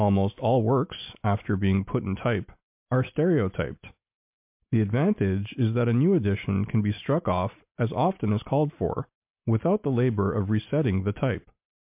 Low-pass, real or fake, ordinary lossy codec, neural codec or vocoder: 3.6 kHz; real; MP3, 32 kbps; none